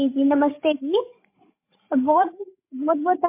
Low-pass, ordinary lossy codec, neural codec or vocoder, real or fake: 3.6 kHz; MP3, 24 kbps; codec, 16 kHz, 16 kbps, FreqCodec, larger model; fake